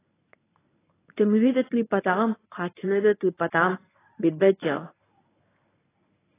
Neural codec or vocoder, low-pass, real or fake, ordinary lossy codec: codec, 24 kHz, 0.9 kbps, WavTokenizer, medium speech release version 1; 3.6 kHz; fake; AAC, 16 kbps